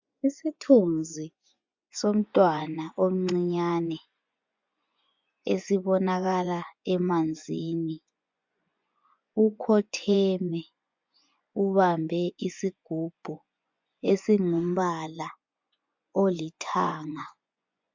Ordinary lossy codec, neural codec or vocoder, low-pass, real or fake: MP3, 64 kbps; vocoder, 24 kHz, 100 mel bands, Vocos; 7.2 kHz; fake